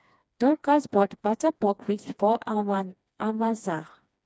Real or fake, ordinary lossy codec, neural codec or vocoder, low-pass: fake; none; codec, 16 kHz, 2 kbps, FreqCodec, smaller model; none